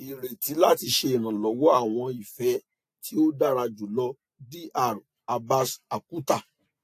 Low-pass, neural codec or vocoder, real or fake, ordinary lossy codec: 14.4 kHz; none; real; AAC, 64 kbps